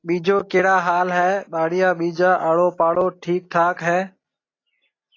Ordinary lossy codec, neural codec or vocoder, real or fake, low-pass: AAC, 32 kbps; none; real; 7.2 kHz